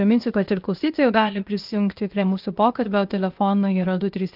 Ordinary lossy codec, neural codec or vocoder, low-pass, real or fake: Opus, 24 kbps; codec, 16 kHz, 0.8 kbps, ZipCodec; 5.4 kHz; fake